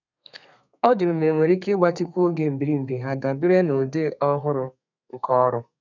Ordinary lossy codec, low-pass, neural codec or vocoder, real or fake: none; 7.2 kHz; codec, 32 kHz, 1.9 kbps, SNAC; fake